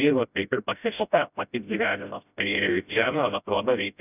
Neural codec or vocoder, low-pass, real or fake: codec, 16 kHz, 0.5 kbps, FreqCodec, smaller model; 3.6 kHz; fake